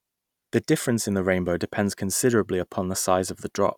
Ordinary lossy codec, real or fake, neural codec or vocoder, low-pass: none; real; none; 19.8 kHz